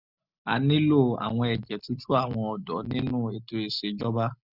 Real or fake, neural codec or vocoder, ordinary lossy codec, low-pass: real; none; none; 5.4 kHz